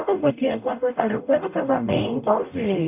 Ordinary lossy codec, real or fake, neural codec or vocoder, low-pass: none; fake; codec, 44.1 kHz, 0.9 kbps, DAC; 3.6 kHz